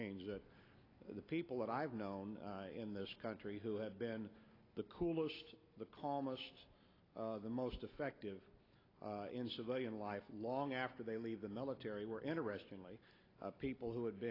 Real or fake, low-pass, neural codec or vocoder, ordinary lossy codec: real; 5.4 kHz; none; AAC, 24 kbps